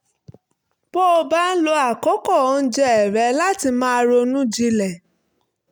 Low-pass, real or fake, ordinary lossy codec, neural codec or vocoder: none; real; none; none